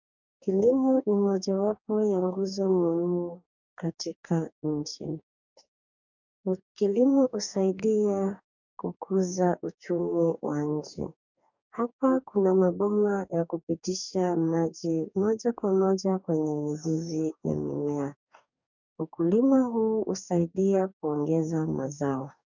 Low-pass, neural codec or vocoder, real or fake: 7.2 kHz; codec, 44.1 kHz, 2.6 kbps, DAC; fake